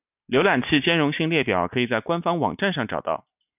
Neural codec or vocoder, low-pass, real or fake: none; 3.6 kHz; real